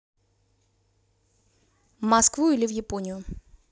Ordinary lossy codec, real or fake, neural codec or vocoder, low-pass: none; real; none; none